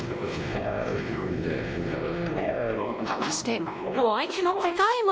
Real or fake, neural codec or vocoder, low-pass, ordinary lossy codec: fake; codec, 16 kHz, 1 kbps, X-Codec, WavLM features, trained on Multilingual LibriSpeech; none; none